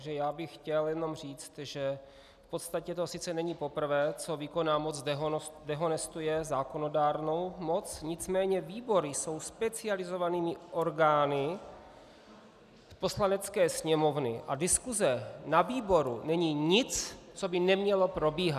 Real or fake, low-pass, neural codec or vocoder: real; 14.4 kHz; none